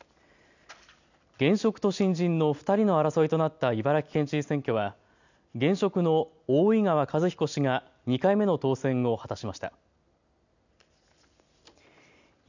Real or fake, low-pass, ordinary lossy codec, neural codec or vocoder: real; 7.2 kHz; none; none